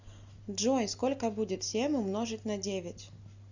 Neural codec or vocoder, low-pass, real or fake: none; 7.2 kHz; real